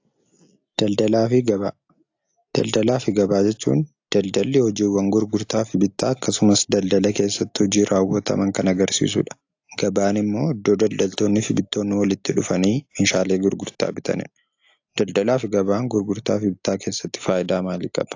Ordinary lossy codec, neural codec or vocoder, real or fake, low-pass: AAC, 48 kbps; none; real; 7.2 kHz